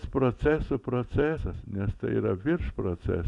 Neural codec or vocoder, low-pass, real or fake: none; 10.8 kHz; real